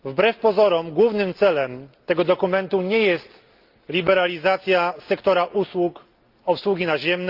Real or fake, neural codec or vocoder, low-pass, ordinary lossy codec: real; none; 5.4 kHz; Opus, 24 kbps